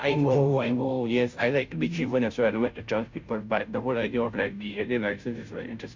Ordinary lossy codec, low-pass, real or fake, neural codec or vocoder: MP3, 64 kbps; 7.2 kHz; fake; codec, 16 kHz, 0.5 kbps, FunCodec, trained on Chinese and English, 25 frames a second